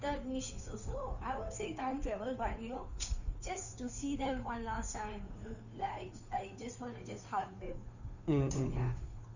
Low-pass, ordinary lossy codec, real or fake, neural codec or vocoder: 7.2 kHz; none; fake; codec, 16 kHz, 2 kbps, FunCodec, trained on Chinese and English, 25 frames a second